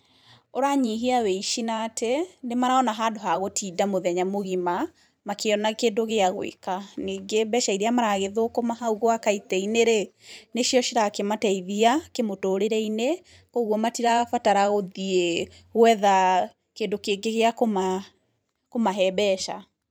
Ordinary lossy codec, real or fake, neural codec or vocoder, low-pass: none; fake; vocoder, 44.1 kHz, 128 mel bands every 512 samples, BigVGAN v2; none